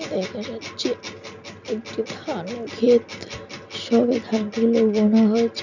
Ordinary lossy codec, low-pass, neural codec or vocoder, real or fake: none; 7.2 kHz; none; real